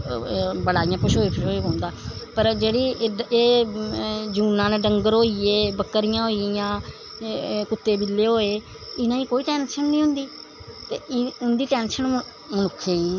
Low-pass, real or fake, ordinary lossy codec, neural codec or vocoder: 7.2 kHz; real; none; none